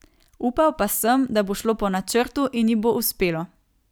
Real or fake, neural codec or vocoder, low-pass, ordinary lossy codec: real; none; none; none